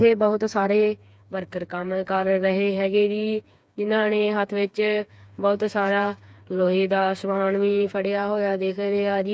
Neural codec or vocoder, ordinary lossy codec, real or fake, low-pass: codec, 16 kHz, 4 kbps, FreqCodec, smaller model; none; fake; none